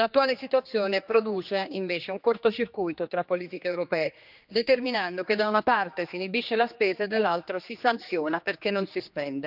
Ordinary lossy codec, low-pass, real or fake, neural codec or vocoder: none; 5.4 kHz; fake; codec, 16 kHz, 2 kbps, X-Codec, HuBERT features, trained on general audio